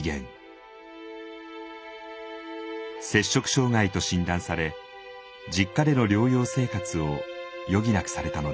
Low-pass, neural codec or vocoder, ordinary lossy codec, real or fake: none; none; none; real